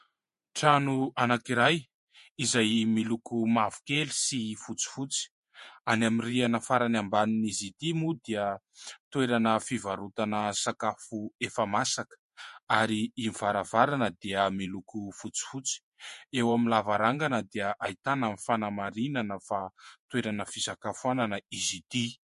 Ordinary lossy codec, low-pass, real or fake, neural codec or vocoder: MP3, 48 kbps; 14.4 kHz; fake; vocoder, 48 kHz, 128 mel bands, Vocos